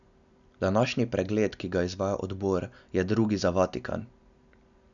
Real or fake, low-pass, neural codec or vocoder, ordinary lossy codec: real; 7.2 kHz; none; none